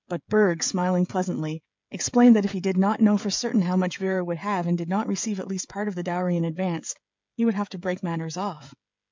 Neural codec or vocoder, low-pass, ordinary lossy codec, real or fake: codec, 16 kHz, 16 kbps, FreqCodec, smaller model; 7.2 kHz; MP3, 64 kbps; fake